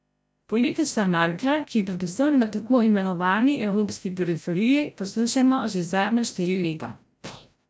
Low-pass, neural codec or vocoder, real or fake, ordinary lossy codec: none; codec, 16 kHz, 0.5 kbps, FreqCodec, larger model; fake; none